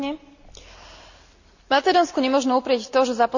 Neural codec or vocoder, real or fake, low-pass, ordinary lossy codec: none; real; 7.2 kHz; none